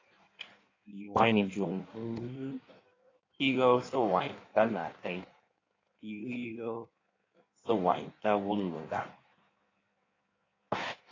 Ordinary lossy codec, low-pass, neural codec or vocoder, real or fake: AAC, 32 kbps; 7.2 kHz; codec, 16 kHz in and 24 kHz out, 1.1 kbps, FireRedTTS-2 codec; fake